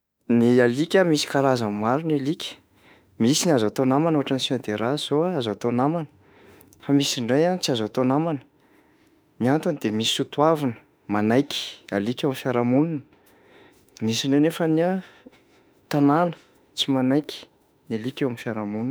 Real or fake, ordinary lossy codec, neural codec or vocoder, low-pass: fake; none; autoencoder, 48 kHz, 32 numbers a frame, DAC-VAE, trained on Japanese speech; none